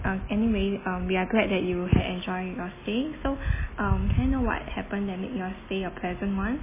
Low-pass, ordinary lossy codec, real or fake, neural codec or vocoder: 3.6 kHz; MP3, 16 kbps; real; none